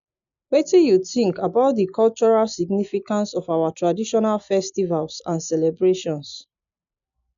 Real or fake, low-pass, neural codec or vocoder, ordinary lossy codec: real; 7.2 kHz; none; none